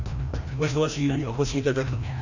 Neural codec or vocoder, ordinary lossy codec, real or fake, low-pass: codec, 16 kHz, 1 kbps, FreqCodec, larger model; none; fake; 7.2 kHz